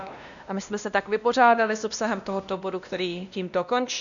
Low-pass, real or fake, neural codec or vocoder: 7.2 kHz; fake; codec, 16 kHz, 1 kbps, X-Codec, WavLM features, trained on Multilingual LibriSpeech